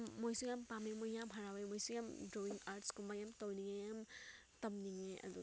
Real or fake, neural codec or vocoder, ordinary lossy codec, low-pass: real; none; none; none